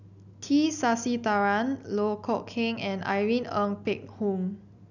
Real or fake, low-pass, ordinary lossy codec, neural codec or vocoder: real; 7.2 kHz; none; none